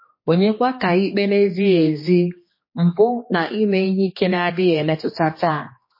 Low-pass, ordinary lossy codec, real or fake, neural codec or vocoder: 5.4 kHz; MP3, 24 kbps; fake; codec, 16 kHz, 2 kbps, X-Codec, HuBERT features, trained on general audio